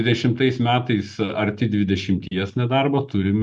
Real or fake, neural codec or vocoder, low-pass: real; none; 10.8 kHz